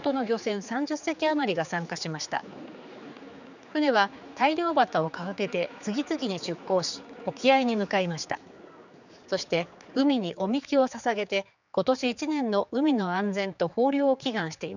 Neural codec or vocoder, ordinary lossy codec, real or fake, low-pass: codec, 16 kHz, 4 kbps, X-Codec, HuBERT features, trained on general audio; none; fake; 7.2 kHz